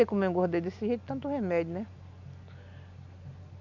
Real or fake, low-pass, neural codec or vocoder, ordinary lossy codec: real; 7.2 kHz; none; none